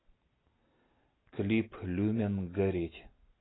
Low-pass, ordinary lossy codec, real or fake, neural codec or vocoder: 7.2 kHz; AAC, 16 kbps; real; none